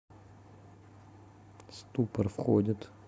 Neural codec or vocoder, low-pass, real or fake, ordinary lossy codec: none; none; real; none